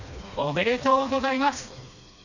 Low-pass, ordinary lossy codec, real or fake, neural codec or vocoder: 7.2 kHz; none; fake; codec, 16 kHz, 2 kbps, FreqCodec, smaller model